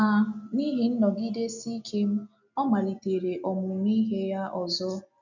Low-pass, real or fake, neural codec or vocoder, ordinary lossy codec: 7.2 kHz; real; none; none